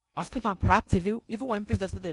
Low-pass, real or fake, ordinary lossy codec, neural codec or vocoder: 10.8 kHz; fake; none; codec, 16 kHz in and 24 kHz out, 0.6 kbps, FocalCodec, streaming, 2048 codes